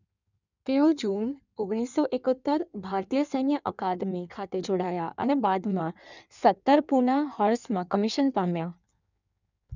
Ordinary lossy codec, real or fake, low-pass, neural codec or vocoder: none; fake; 7.2 kHz; codec, 16 kHz in and 24 kHz out, 1.1 kbps, FireRedTTS-2 codec